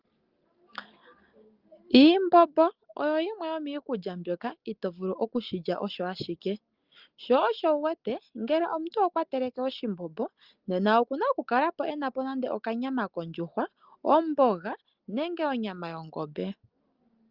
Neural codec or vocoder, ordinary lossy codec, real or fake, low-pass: none; Opus, 32 kbps; real; 5.4 kHz